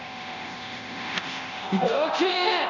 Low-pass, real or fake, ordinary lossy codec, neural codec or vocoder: 7.2 kHz; fake; none; codec, 24 kHz, 0.9 kbps, DualCodec